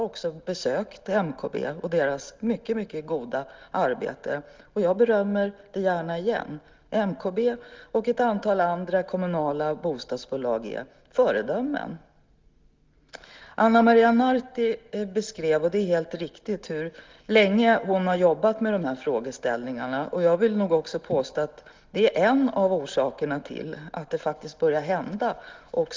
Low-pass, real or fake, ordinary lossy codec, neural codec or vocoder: 7.2 kHz; real; Opus, 32 kbps; none